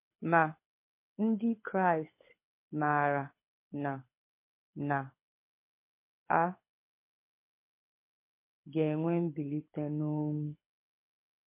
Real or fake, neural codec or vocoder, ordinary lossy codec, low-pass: fake; codec, 24 kHz, 6 kbps, HILCodec; MP3, 24 kbps; 3.6 kHz